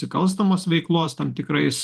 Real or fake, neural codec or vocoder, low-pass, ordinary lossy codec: fake; vocoder, 48 kHz, 128 mel bands, Vocos; 14.4 kHz; Opus, 32 kbps